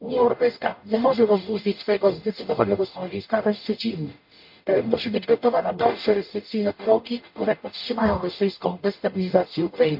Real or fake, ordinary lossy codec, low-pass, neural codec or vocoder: fake; MP3, 32 kbps; 5.4 kHz; codec, 44.1 kHz, 0.9 kbps, DAC